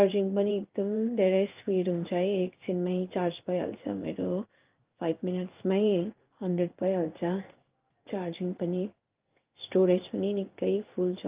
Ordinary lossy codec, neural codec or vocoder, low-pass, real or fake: Opus, 24 kbps; codec, 16 kHz in and 24 kHz out, 1 kbps, XY-Tokenizer; 3.6 kHz; fake